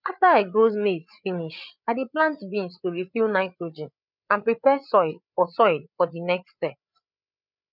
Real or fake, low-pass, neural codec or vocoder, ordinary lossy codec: fake; 5.4 kHz; codec, 16 kHz, 8 kbps, FreqCodec, larger model; none